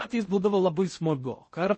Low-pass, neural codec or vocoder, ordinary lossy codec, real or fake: 10.8 kHz; codec, 16 kHz in and 24 kHz out, 0.6 kbps, FocalCodec, streaming, 4096 codes; MP3, 32 kbps; fake